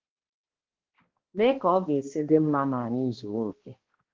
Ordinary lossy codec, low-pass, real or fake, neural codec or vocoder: Opus, 16 kbps; 7.2 kHz; fake; codec, 16 kHz, 1 kbps, X-Codec, HuBERT features, trained on balanced general audio